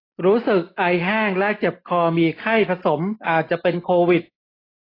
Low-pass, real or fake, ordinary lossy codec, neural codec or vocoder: 5.4 kHz; real; AAC, 24 kbps; none